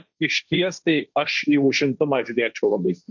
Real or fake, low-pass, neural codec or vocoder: fake; 7.2 kHz; codec, 16 kHz, 1.1 kbps, Voila-Tokenizer